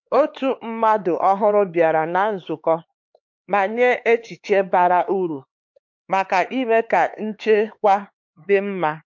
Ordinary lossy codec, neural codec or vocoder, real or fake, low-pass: MP3, 48 kbps; codec, 16 kHz, 4 kbps, X-Codec, HuBERT features, trained on LibriSpeech; fake; 7.2 kHz